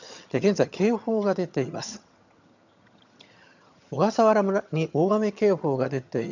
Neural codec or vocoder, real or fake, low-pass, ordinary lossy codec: vocoder, 22.05 kHz, 80 mel bands, HiFi-GAN; fake; 7.2 kHz; none